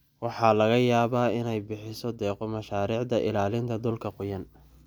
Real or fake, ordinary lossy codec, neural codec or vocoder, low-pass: real; none; none; none